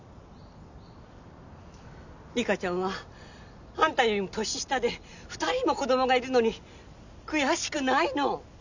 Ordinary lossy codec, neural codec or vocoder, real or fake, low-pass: none; none; real; 7.2 kHz